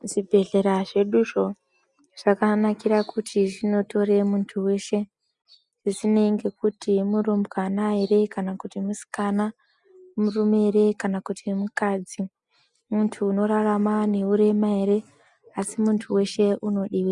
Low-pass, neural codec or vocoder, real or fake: 10.8 kHz; none; real